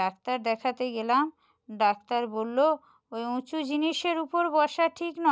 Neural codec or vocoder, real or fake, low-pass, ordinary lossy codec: none; real; none; none